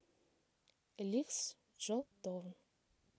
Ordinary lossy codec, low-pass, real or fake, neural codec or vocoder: none; none; real; none